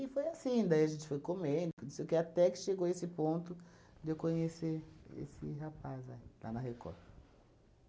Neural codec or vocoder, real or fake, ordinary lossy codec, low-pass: none; real; none; none